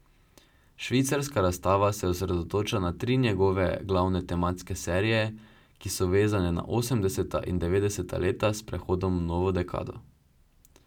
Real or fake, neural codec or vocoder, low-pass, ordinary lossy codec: real; none; 19.8 kHz; none